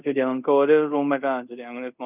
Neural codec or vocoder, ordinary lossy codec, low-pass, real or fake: codec, 24 kHz, 0.5 kbps, DualCodec; none; 3.6 kHz; fake